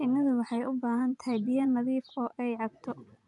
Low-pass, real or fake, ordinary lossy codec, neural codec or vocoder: 10.8 kHz; fake; none; vocoder, 24 kHz, 100 mel bands, Vocos